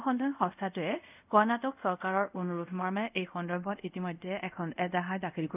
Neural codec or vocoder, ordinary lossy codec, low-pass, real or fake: codec, 24 kHz, 0.5 kbps, DualCodec; none; 3.6 kHz; fake